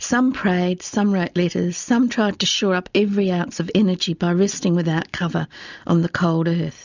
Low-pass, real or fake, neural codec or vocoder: 7.2 kHz; real; none